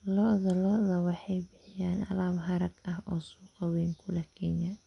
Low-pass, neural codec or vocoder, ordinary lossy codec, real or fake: 10.8 kHz; none; none; real